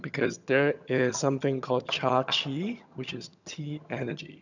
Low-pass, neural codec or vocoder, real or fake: 7.2 kHz; vocoder, 22.05 kHz, 80 mel bands, HiFi-GAN; fake